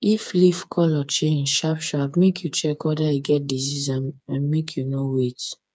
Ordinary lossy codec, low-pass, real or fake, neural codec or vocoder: none; none; fake; codec, 16 kHz, 4 kbps, FreqCodec, smaller model